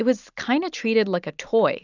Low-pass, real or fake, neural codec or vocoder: 7.2 kHz; real; none